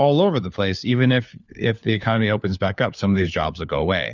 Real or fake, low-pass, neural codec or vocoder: fake; 7.2 kHz; codec, 16 kHz, 4 kbps, FunCodec, trained on LibriTTS, 50 frames a second